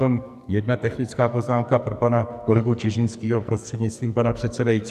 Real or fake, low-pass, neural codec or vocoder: fake; 14.4 kHz; codec, 44.1 kHz, 2.6 kbps, SNAC